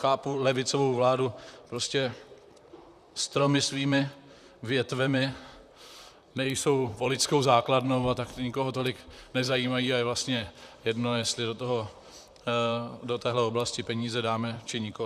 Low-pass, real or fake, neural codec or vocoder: 14.4 kHz; fake; vocoder, 44.1 kHz, 128 mel bands, Pupu-Vocoder